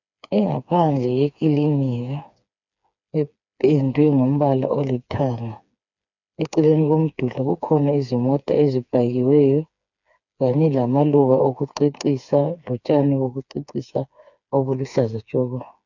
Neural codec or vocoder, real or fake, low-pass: codec, 16 kHz, 4 kbps, FreqCodec, smaller model; fake; 7.2 kHz